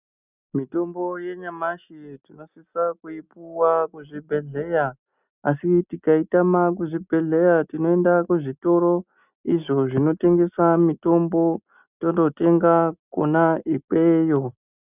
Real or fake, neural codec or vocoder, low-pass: real; none; 3.6 kHz